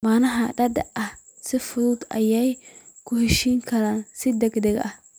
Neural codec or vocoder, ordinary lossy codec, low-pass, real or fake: none; none; none; real